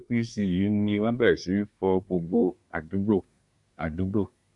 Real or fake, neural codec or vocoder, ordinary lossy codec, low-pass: fake; codec, 24 kHz, 1 kbps, SNAC; none; 10.8 kHz